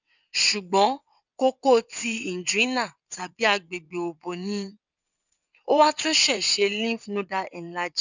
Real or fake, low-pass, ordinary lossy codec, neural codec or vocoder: fake; 7.2 kHz; none; vocoder, 22.05 kHz, 80 mel bands, WaveNeXt